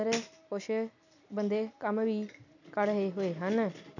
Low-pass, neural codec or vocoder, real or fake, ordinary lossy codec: 7.2 kHz; none; real; none